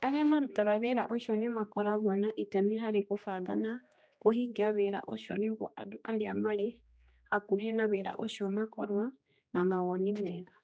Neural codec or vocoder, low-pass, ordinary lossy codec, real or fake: codec, 16 kHz, 1 kbps, X-Codec, HuBERT features, trained on general audio; none; none; fake